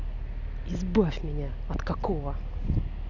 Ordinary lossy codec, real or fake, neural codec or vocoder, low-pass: none; real; none; 7.2 kHz